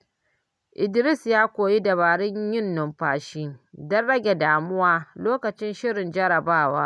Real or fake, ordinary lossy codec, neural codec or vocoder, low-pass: real; none; none; none